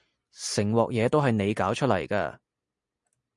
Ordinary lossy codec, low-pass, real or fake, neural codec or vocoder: MP3, 96 kbps; 10.8 kHz; real; none